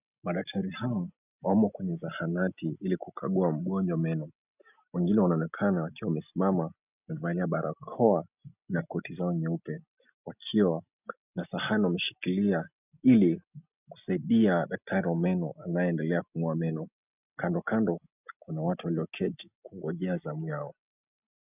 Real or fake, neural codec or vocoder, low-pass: real; none; 3.6 kHz